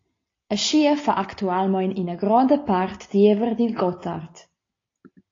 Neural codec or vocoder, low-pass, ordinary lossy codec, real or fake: none; 7.2 kHz; AAC, 32 kbps; real